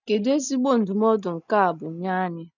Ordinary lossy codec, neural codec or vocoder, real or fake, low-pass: none; none; real; 7.2 kHz